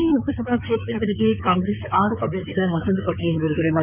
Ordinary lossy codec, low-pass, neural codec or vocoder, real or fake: none; 3.6 kHz; codec, 16 kHz in and 24 kHz out, 2.2 kbps, FireRedTTS-2 codec; fake